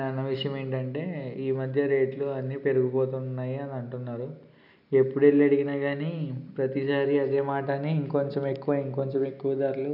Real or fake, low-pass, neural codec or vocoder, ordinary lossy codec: real; 5.4 kHz; none; none